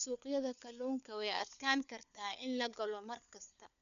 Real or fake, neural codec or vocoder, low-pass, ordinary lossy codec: fake; codec, 16 kHz, 4 kbps, FunCodec, trained on LibriTTS, 50 frames a second; 7.2 kHz; none